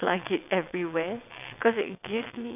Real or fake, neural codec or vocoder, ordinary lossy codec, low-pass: fake; vocoder, 22.05 kHz, 80 mel bands, WaveNeXt; none; 3.6 kHz